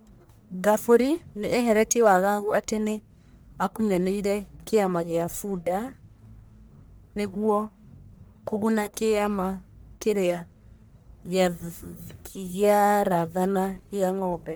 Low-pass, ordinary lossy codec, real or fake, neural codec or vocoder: none; none; fake; codec, 44.1 kHz, 1.7 kbps, Pupu-Codec